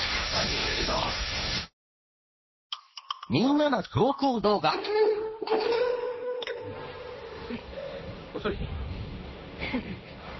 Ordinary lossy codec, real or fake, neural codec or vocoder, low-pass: MP3, 24 kbps; fake; codec, 16 kHz, 1.1 kbps, Voila-Tokenizer; 7.2 kHz